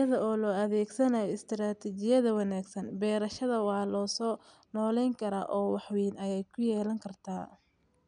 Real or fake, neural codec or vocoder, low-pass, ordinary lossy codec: real; none; 9.9 kHz; none